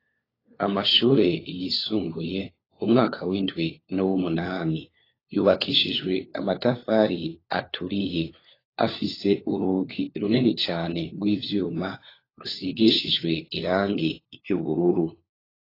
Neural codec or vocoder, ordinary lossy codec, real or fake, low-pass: codec, 16 kHz, 4 kbps, FunCodec, trained on LibriTTS, 50 frames a second; AAC, 24 kbps; fake; 5.4 kHz